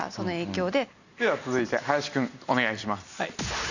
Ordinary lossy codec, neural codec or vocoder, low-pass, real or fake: none; none; 7.2 kHz; real